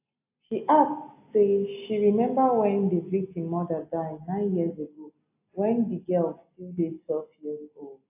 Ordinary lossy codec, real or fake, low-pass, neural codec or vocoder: AAC, 32 kbps; real; 3.6 kHz; none